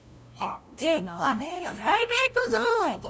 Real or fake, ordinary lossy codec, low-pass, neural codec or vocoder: fake; none; none; codec, 16 kHz, 0.5 kbps, FunCodec, trained on LibriTTS, 25 frames a second